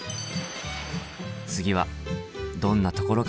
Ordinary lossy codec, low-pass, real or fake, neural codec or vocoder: none; none; real; none